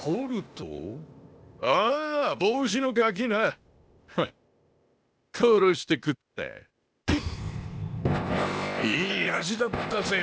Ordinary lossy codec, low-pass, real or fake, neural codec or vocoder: none; none; fake; codec, 16 kHz, 0.8 kbps, ZipCodec